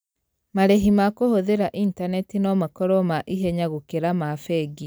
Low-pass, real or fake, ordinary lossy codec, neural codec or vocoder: none; real; none; none